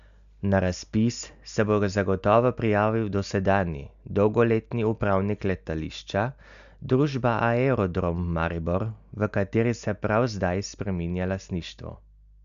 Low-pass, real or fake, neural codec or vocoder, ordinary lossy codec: 7.2 kHz; real; none; none